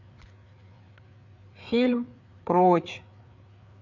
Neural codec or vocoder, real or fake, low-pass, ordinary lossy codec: codec, 16 kHz, 4 kbps, FreqCodec, larger model; fake; 7.2 kHz; none